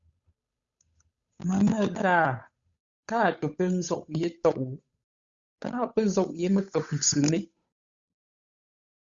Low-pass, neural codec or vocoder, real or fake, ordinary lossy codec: 7.2 kHz; codec, 16 kHz, 8 kbps, FunCodec, trained on Chinese and English, 25 frames a second; fake; Opus, 64 kbps